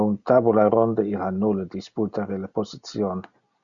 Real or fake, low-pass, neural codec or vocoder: real; 7.2 kHz; none